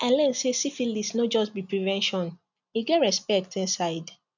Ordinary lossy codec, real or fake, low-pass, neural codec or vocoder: none; real; 7.2 kHz; none